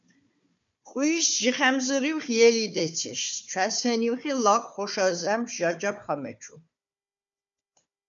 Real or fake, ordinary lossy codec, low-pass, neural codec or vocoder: fake; MP3, 64 kbps; 7.2 kHz; codec, 16 kHz, 4 kbps, FunCodec, trained on Chinese and English, 50 frames a second